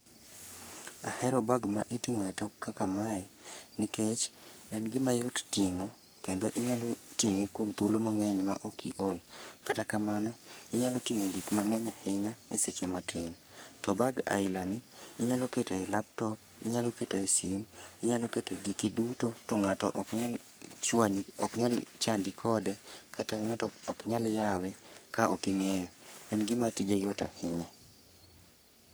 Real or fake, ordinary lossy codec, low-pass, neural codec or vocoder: fake; none; none; codec, 44.1 kHz, 3.4 kbps, Pupu-Codec